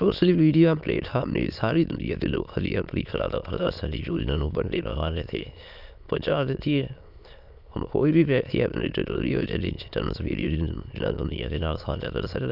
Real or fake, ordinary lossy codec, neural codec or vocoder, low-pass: fake; none; autoencoder, 22.05 kHz, a latent of 192 numbers a frame, VITS, trained on many speakers; 5.4 kHz